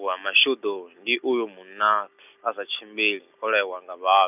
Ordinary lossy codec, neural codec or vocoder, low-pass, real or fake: none; none; 3.6 kHz; real